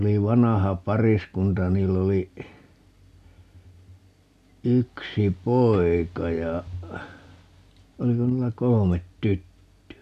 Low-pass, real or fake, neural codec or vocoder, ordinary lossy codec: 14.4 kHz; real; none; none